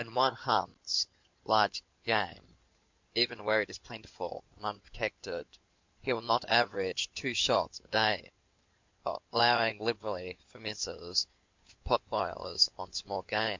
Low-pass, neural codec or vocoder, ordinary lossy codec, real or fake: 7.2 kHz; codec, 16 kHz in and 24 kHz out, 2.2 kbps, FireRedTTS-2 codec; MP3, 64 kbps; fake